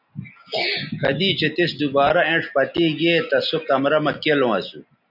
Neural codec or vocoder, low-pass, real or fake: none; 5.4 kHz; real